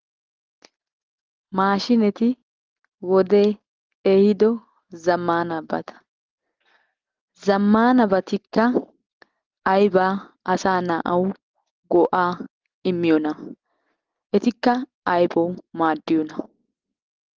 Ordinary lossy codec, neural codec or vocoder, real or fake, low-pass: Opus, 16 kbps; none; real; 7.2 kHz